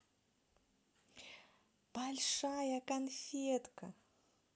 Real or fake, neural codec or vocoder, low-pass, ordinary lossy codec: real; none; none; none